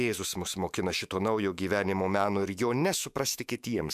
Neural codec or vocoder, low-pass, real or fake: autoencoder, 48 kHz, 128 numbers a frame, DAC-VAE, trained on Japanese speech; 14.4 kHz; fake